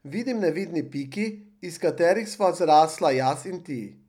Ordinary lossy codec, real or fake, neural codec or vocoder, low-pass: none; real; none; 19.8 kHz